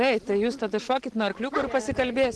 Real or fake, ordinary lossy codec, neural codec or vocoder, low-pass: real; Opus, 16 kbps; none; 10.8 kHz